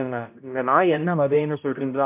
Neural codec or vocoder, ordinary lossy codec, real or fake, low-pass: codec, 16 kHz, 0.5 kbps, X-Codec, HuBERT features, trained on balanced general audio; MP3, 32 kbps; fake; 3.6 kHz